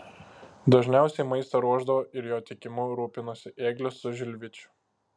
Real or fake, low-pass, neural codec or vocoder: real; 9.9 kHz; none